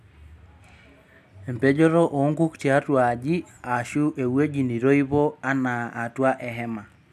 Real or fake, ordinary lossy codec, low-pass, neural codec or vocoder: real; none; 14.4 kHz; none